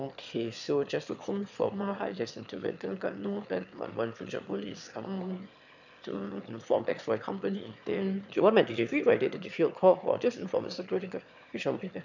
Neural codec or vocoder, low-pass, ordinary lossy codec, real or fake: autoencoder, 22.05 kHz, a latent of 192 numbers a frame, VITS, trained on one speaker; 7.2 kHz; none; fake